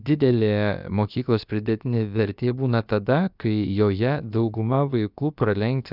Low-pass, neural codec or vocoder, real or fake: 5.4 kHz; codec, 16 kHz, about 1 kbps, DyCAST, with the encoder's durations; fake